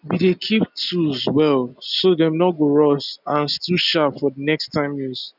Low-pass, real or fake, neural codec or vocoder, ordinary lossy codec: 5.4 kHz; real; none; none